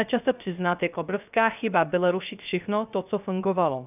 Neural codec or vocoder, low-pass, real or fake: codec, 16 kHz, 0.3 kbps, FocalCodec; 3.6 kHz; fake